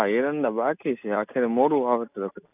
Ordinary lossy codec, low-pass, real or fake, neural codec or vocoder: none; 3.6 kHz; real; none